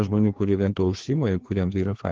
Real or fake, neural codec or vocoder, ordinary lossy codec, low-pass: fake; codec, 16 kHz, 2 kbps, FreqCodec, larger model; Opus, 16 kbps; 7.2 kHz